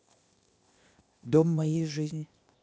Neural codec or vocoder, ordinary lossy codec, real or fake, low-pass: codec, 16 kHz, 0.8 kbps, ZipCodec; none; fake; none